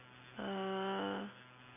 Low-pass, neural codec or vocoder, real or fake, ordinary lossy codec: 3.6 kHz; none; real; none